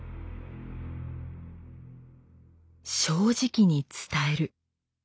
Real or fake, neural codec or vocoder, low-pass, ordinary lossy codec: real; none; none; none